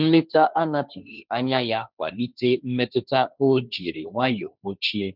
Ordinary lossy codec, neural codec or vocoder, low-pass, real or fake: none; codec, 16 kHz, 1.1 kbps, Voila-Tokenizer; 5.4 kHz; fake